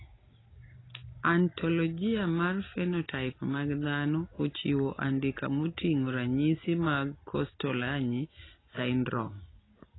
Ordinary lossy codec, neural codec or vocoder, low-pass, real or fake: AAC, 16 kbps; none; 7.2 kHz; real